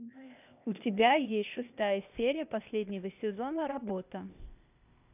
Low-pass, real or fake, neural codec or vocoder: 3.6 kHz; fake; codec, 16 kHz, 0.8 kbps, ZipCodec